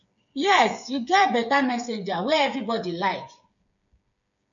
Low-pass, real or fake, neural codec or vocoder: 7.2 kHz; fake; codec, 16 kHz, 8 kbps, FreqCodec, smaller model